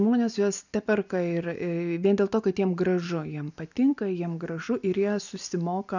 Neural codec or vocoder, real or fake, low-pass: none; real; 7.2 kHz